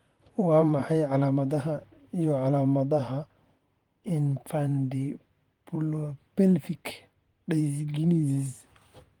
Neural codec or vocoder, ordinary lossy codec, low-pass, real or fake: vocoder, 44.1 kHz, 128 mel bands, Pupu-Vocoder; Opus, 24 kbps; 19.8 kHz; fake